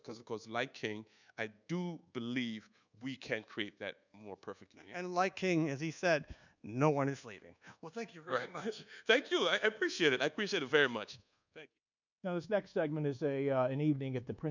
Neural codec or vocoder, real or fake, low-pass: codec, 24 kHz, 1.2 kbps, DualCodec; fake; 7.2 kHz